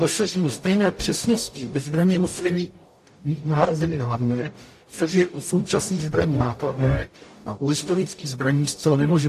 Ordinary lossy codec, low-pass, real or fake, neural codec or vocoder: AAC, 64 kbps; 14.4 kHz; fake; codec, 44.1 kHz, 0.9 kbps, DAC